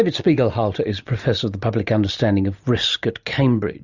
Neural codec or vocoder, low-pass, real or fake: none; 7.2 kHz; real